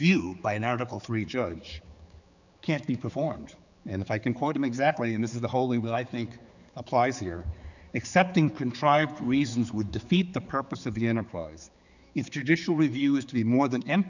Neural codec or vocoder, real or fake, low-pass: codec, 16 kHz, 4 kbps, X-Codec, HuBERT features, trained on general audio; fake; 7.2 kHz